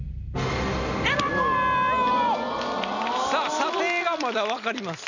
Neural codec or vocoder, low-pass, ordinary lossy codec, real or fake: none; 7.2 kHz; none; real